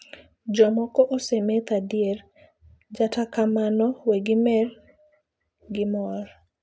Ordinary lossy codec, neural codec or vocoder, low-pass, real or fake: none; none; none; real